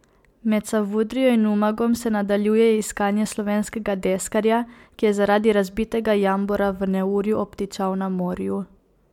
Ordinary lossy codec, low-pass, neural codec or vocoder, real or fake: MP3, 96 kbps; 19.8 kHz; none; real